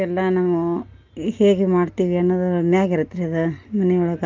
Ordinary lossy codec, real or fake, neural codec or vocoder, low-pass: Opus, 24 kbps; real; none; 7.2 kHz